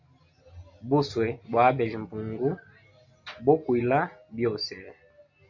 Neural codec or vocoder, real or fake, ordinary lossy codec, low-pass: none; real; MP3, 64 kbps; 7.2 kHz